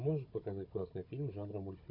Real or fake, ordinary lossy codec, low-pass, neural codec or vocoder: fake; MP3, 32 kbps; 5.4 kHz; codec, 16 kHz, 16 kbps, FunCodec, trained on Chinese and English, 50 frames a second